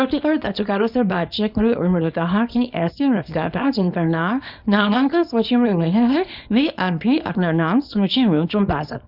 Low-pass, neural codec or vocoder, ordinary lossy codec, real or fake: 5.4 kHz; codec, 24 kHz, 0.9 kbps, WavTokenizer, small release; none; fake